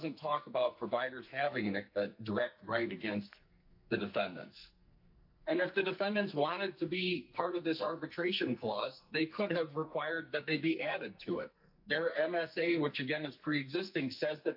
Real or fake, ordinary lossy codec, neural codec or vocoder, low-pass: fake; AAC, 48 kbps; codec, 44.1 kHz, 2.6 kbps, SNAC; 5.4 kHz